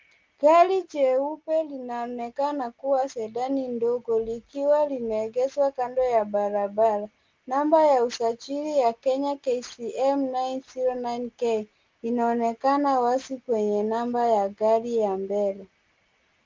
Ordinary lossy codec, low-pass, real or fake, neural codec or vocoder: Opus, 32 kbps; 7.2 kHz; real; none